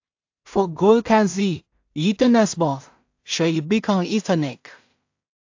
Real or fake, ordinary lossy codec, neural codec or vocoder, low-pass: fake; AAC, 48 kbps; codec, 16 kHz in and 24 kHz out, 0.4 kbps, LongCat-Audio-Codec, two codebook decoder; 7.2 kHz